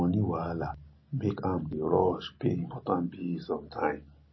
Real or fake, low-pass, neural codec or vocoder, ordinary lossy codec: real; 7.2 kHz; none; MP3, 24 kbps